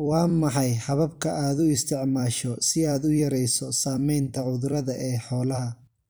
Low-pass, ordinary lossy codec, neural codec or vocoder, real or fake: none; none; vocoder, 44.1 kHz, 128 mel bands every 256 samples, BigVGAN v2; fake